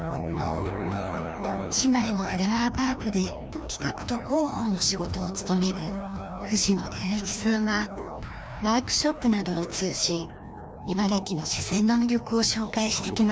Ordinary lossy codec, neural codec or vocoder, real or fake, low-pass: none; codec, 16 kHz, 1 kbps, FreqCodec, larger model; fake; none